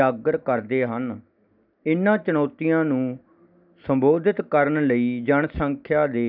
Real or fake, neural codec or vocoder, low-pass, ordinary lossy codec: real; none; 5.4 kHz; none